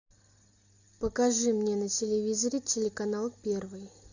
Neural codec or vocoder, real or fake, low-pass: none; real; 7.2 kHz